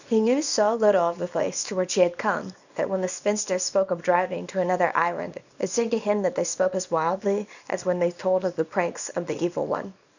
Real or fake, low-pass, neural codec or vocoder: fake; 7.2 kHz; codec, 24 kHz, 0.9 kbps, WavTokenizer, small release